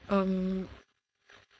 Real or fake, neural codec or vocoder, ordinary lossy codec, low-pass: fake; codec, 16 kHz, 4.8 kbps, FACodec; none; none